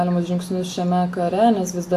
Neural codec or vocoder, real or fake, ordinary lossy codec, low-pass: none; real; AAC, 64 kbps; 14.4 kHz